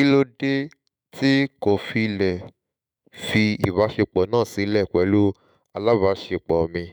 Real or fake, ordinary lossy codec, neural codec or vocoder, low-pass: fake; none; autoencoder, 48 kHz, 128 numbers a frame, DAC-VAE, trained on Japanese speech; none